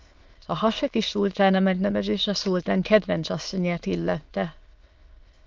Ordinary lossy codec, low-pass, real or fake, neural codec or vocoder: Opus, 24 kbps; 7.2 kHz; fake; autoencoder, 22.05 kHz, a latent of 192 numbers a frame, VITS, trained on many speakers